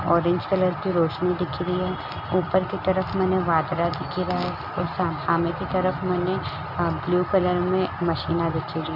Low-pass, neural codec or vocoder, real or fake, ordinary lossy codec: 5.4 kHz; none; real; none